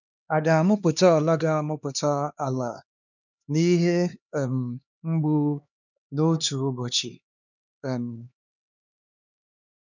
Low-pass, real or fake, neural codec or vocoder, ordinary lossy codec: 7.2 kHz; fake; codec, 16 kHz, 2 kbps, X-Codec, HuBERT features, trained on LibriSpeech; none